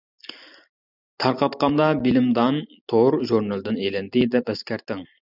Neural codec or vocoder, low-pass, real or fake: none; 5.4 kHz; real